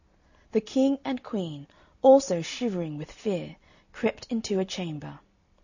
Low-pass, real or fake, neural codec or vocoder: 7.2 kHz; real; none